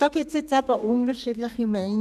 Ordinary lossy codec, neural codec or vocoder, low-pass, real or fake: none; codec, 32 kHz, 1.9 kbps, SNAC; 14.4 kHz; fake